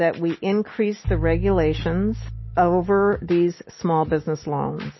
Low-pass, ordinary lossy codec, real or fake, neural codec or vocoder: 7.2 kHz; MP3, 24 kbps; real; none